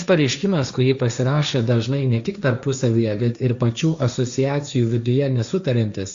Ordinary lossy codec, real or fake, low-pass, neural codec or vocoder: Opus, 64 kbps; fake; 7.2 kHz; codec, 16 kHz, 1.1 kbps, Voila-Tokenizer